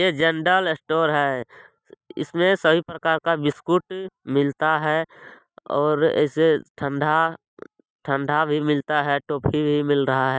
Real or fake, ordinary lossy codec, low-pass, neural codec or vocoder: real; none; none; none